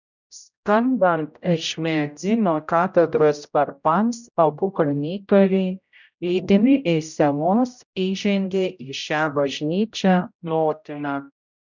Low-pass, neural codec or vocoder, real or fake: 7.2 kHz; codec, 16 kHz, 0.5 kbps, X-Codec, HuBERT features, trained on general audio; fake